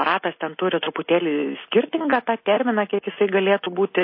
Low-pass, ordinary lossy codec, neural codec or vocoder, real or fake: 5.4 kHz; MP3, 24 kbps; none; real